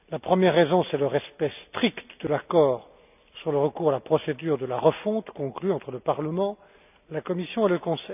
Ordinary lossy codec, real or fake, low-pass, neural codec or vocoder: none; real; 3.6 kHz; none